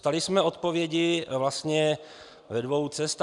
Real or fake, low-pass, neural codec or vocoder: real; 10.8 kHz; none